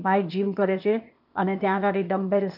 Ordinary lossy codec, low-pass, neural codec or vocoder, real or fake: none; 5.4 kHz; codec, 16 kHz, 0.8 kbps, ZipCodec; fake